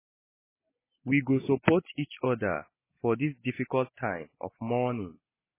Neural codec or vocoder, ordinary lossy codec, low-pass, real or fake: none; MP3, 16 kbps; 3.6 kHz; real